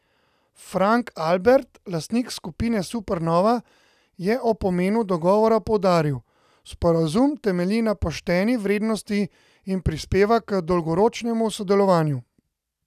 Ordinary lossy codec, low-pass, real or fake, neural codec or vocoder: none; 14.4 kHz; real; none